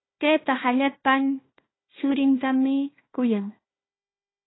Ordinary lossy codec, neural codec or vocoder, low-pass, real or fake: AAC, 16 kbps; codec, 16 kHz, 1 kbps, FunCodec, trained on Chinese and English, 50 frames a second; 7.2 kHz; fake